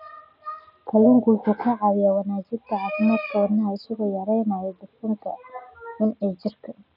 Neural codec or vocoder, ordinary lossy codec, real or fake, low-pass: none; none; real; 5.4 kHz